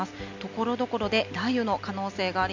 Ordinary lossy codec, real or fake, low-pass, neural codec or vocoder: MP3, 48 kbps; real; 7.2 kHz; none